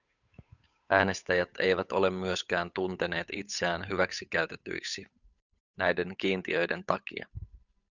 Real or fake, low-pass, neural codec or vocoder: fake; 7.2 kHz; codec, 16 kHz, 8 kbps, FunCodec, trained on Chinese and English, 25 frames a second